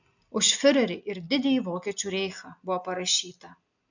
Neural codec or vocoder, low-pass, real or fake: none; 7.2 kHz; real